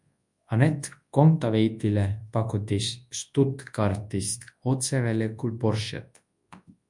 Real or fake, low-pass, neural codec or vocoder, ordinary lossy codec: fake; 10.8 kHz; codec, 24 kHz, 0.9 kbps, WavTokenizer, large speech release; MP3, 64 kbps